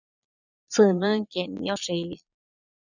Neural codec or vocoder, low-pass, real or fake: vocoder, 22.05 kHz, 80 mel bands, Vocos; 7.2 kHz; fake